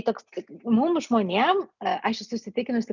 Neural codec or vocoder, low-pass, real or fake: none; 7.2 kHz; real